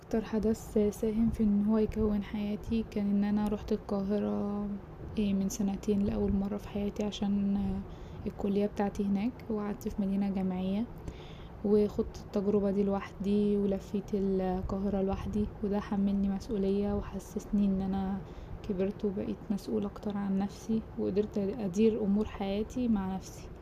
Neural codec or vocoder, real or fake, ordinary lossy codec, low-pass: none; real; none; 14.4 kHz